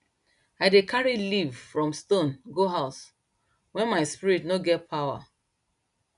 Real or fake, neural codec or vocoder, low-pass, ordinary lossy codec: real; none; 10.8 kHz; none